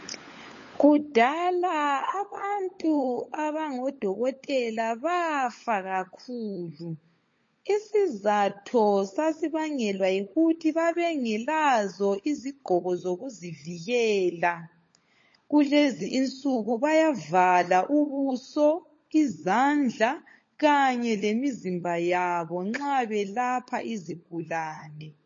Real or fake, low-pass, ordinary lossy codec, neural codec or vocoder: fake; 7.2 kHz; MP3, 32 kbps; codec, 16 kHz, 16 kbps, FunCodec, trained on LibriTTS, 50 frames a second